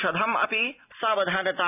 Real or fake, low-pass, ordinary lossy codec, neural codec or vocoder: real; 3.6 kHz; none; none